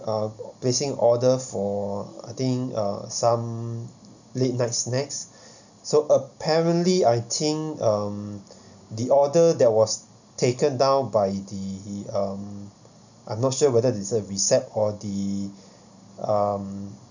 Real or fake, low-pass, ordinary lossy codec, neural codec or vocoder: real; 7.2 kHz; none; none